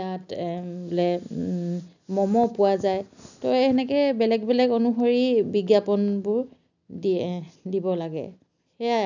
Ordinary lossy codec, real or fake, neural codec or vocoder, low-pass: none; real; none; 7.2 kHz